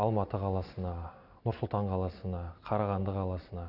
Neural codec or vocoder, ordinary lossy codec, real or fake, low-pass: none; none; real; 5.4 kHz